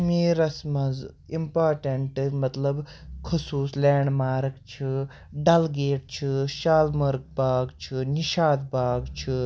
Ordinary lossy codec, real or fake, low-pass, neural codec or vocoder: none; real; none; none